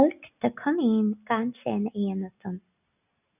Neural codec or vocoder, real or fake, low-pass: none; real; 3.6 kHz